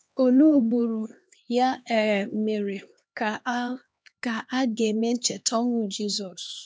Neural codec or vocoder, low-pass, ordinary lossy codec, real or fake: codec, 16 kHz, 2 kbps, X-Codec, HuBERT features, trained on LibriSpeech; none; none; fake